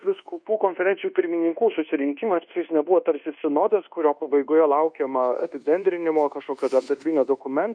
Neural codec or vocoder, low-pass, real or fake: codec, 24 kHz, 1.2 kbps, DualCodec; 9.9 kHz; fake